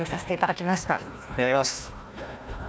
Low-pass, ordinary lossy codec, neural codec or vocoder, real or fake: none; none; codec, 16 kHz, 1 kbps, FunCodec, trained on Chinese and English, 50 frames a second; fake